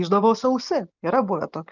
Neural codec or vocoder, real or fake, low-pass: none; real; 7.2 kHz